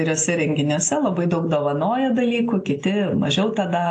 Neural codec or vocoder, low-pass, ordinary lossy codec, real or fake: none; 10.8 kHz; AAC, 48 kbps; real